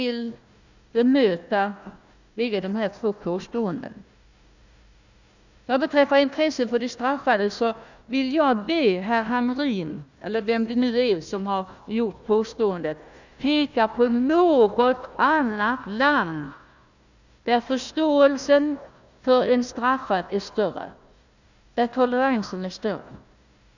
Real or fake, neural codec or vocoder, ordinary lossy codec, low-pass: fake; codec, 16 kHz, 1 kbps, FunCodec, trained on Chinese and English, 50 frames a second; none; 7.2 kHz